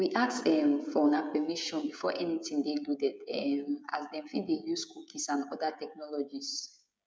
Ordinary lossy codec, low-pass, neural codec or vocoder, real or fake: none; none; codec, 16 kHz, 16 kbps, FreqCodec, smaller model; fake